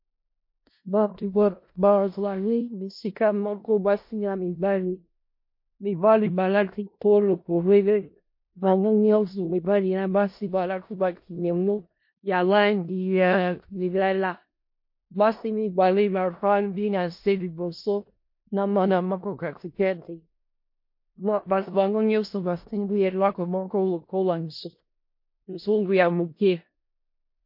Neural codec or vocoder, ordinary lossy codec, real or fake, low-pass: codec, 16 kHz in and 24 kHz out, 0.4 kbps, LongCat-Audio-Codec, four codebook decoder; MP3, 32 kbps; fake; 5.4 kHz